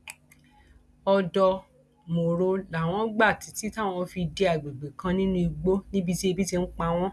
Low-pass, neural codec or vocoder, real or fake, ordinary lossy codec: none; none; real; none